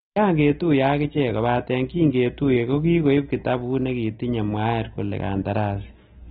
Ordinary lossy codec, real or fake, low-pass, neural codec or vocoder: AAC, 16 kbps; real; 7.2 kHz; none